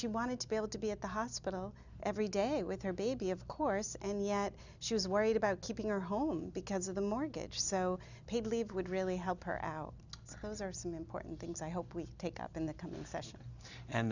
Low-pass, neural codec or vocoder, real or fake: 7.2 kHz; none; real